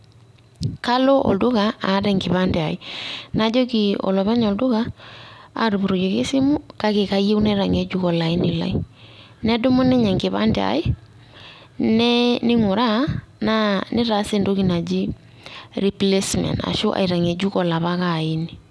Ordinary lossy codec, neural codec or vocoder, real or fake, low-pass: none; none; real; none